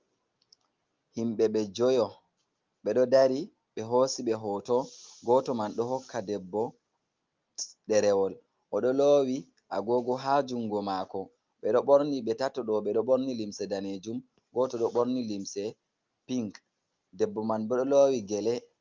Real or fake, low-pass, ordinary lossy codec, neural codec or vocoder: real; 7.2 kHz; Opus, 24 kbps; none